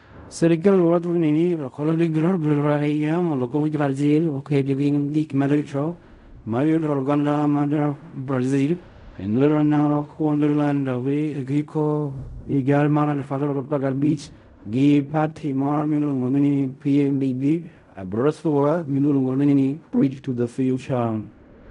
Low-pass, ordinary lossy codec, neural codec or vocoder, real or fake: 10.8 kHz; none; codec, 16 kHz in and 24 kHz out, 0.4 kbps, LongCat-Audio-Codec, fine tuned four codebook decoder; fake